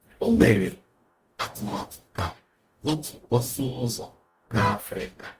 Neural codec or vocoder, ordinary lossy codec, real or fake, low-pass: codec, 44.1 kHz, 0.9 kbps, DAC; Opus, 32 kbps; fake; 14.4 kHz